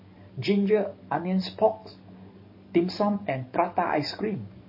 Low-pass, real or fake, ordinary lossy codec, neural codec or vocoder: 5.4 kHz; fake; MP3, 24 kbps; codec, 44.1 kHz, 7.8 kbps, DAC